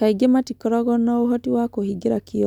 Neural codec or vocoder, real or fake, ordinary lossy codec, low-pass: none; real; none; 19.8 kHz